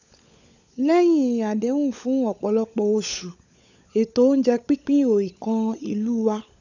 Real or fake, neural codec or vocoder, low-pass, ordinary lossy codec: fake; codec, 16 kHz, 16 kbps, FunCodec, trained on LibriTTS, 50 frames a second; 7.2 kHz; none